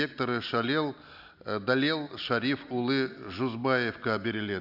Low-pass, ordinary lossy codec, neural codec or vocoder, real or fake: 5.4 kHz; none; none; real